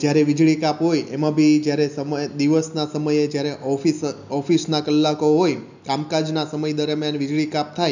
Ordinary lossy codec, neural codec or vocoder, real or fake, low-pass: none; none; real; 7.2 kHz